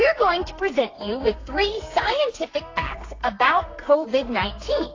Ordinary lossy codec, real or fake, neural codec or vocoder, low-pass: AAC, 32 kbps; fake; codec, 32 kHz, 1.9 kbps, SNAC; 7.2 kHz